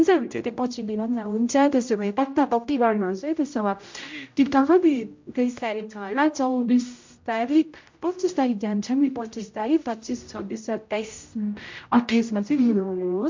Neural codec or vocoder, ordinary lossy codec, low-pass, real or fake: codec, 16 kHz, 0.5 kbps, X-Codec, HuBERT features, trained on general audio; MP3, 48 kbps; 7.2 kHz; fake